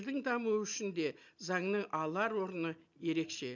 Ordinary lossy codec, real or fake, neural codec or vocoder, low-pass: none; real; none; 7.2 kHz